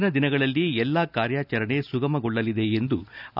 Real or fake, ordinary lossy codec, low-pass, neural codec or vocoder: real; none; 5.4 kHz; none